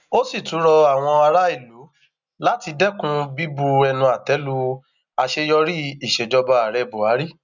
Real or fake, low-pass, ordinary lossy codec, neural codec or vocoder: real; 7.2 kHz; none; none